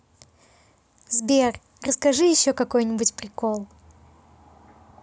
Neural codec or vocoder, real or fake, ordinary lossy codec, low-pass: none; real; none; none